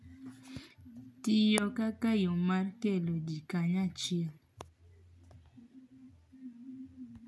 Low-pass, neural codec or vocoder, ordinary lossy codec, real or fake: none; none; none; real